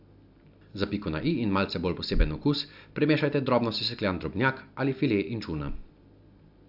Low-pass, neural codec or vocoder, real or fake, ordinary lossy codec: 5.4 kHz; none; real; AAC, 48 kbps